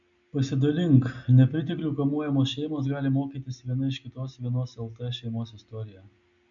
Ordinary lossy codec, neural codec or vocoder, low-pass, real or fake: MP3, 96 kbps; none; 7.2 kHz; real